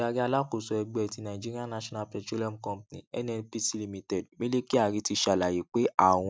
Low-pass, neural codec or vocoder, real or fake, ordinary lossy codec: none; none; real; none